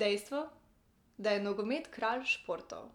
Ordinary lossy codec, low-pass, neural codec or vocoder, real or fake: none; 19.8 kHz; none; real